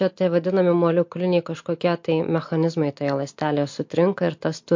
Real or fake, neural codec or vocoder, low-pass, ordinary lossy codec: real; none; 7.2 kHz; MP3, 48 kbps